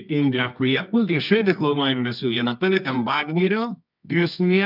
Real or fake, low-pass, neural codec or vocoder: fake; 5.4 kHz; codec, 24 kHz, 0.9 kbps, WavTokenizer, medium music audio release